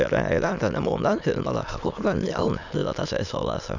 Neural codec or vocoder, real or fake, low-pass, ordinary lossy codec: autoencoder, 22.05 kHz, a latent of 192 numbers a frame, VITS, trained on many speakers; fake; 7.2 kHz; none